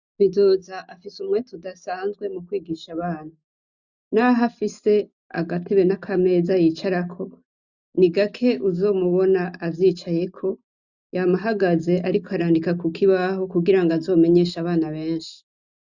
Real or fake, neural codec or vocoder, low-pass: real; none; 7.2 kHz